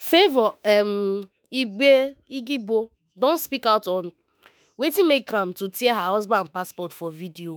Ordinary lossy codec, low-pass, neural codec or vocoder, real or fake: none; none; autoencoder, 48 kHz, 32 numbers a frame, DAC-VAE, trained on Japanese speech; fake